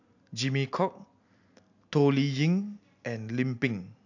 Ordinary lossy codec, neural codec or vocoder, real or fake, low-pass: AAC, 48 kbps; none; real; 7.2 kHz